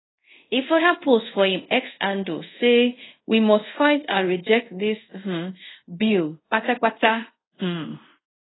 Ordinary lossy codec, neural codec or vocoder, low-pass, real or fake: AAC, 16 kbps; codec, 24 kHz, 0.5 kbps, DualCodec; 7.2 kHz; fake